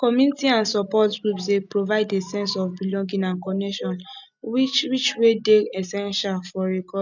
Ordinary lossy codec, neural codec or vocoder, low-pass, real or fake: none; none; 7.2 kHz; real